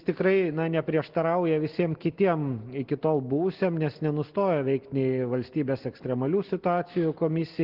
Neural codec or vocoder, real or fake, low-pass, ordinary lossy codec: none; real; 5.4 kHz; Opus, 16 kbps